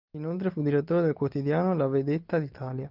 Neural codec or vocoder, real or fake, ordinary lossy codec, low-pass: none; real; Opus, 24 kbps; 5.4 kHz